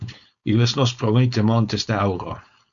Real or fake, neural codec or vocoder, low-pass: fake; codec, 16 kHz, 4.8 kbps, FACodec; 7.2 kHz